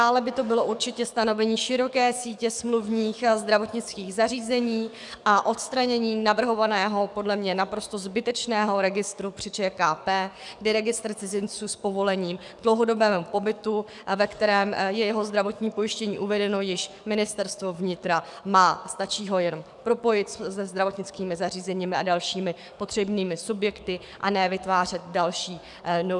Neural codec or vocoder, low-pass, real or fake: codec, 44.1 kHz, 7.8 kbps, DAC; 10.8 kHz; fake